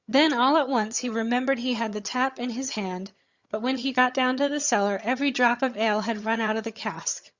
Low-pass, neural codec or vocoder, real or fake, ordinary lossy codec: 7.2 kHz; vocoder, 22.05 kHz, 80 mel bands, HiFi-GAN; fake; Opus, 64 kbps